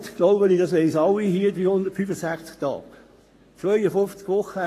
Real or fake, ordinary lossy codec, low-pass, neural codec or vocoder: fake; AAC, 48 kbps; 14.4 kHz; codec, 44.1 kHz, 7.8 kbps, Pupu-Codec